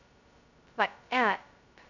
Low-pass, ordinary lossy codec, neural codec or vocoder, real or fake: 7.2 kHz; none; codec, 16 kHz, 0.2 kbps, FocalCodec; fake